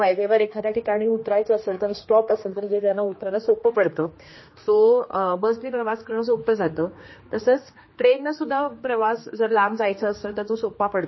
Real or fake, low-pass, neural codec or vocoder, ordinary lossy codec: fake; 7.2 kHz; codec, 16 kHz, 2 kbps, X-Codec, HuBERT features, trained on general audio; MP3, 24 kbps